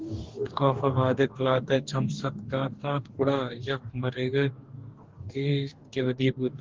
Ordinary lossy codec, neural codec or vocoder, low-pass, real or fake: Opus, 16 kbps; codec, 44.1 kHz, 2.6 kbps, DAC; 7.2 kHz; fake